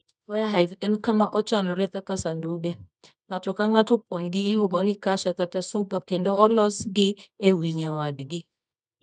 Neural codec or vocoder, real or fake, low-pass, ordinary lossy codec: codec, 24 kHz, 0.9 kbps, WavTokenizer, medium music audio release; fake; none; none